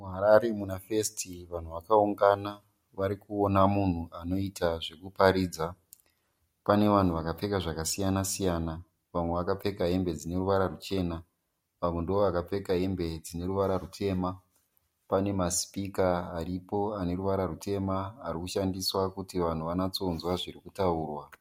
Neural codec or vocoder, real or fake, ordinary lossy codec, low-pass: none; real; MP3, 64 kbps; 19.8 kHz